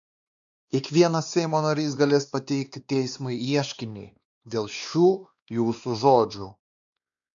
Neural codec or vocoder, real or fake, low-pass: codec, 16 kHz, 2 kbps, X-Codec, WavLM features, trained on Multilingual LibriSpeech; fake; 7.2 kHz